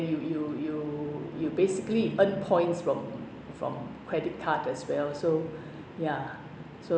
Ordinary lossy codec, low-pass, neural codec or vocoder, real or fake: none; none; none; real